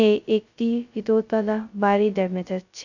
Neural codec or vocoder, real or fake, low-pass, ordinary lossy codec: codec, 16 kHz, 0.2 kbps, FocalCodec; fake; 7.2 kHz; none